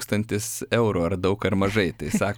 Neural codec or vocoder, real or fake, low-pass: none; real; 19.8 kHz